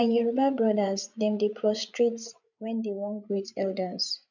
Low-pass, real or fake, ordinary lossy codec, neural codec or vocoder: 7.2 kHz; fake; none; codec, 16 kHz, 8 kbps, FreqCodec, larger model